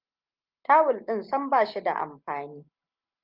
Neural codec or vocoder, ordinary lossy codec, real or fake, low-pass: none; Opus, 32 kbps; real; 5.4 kHz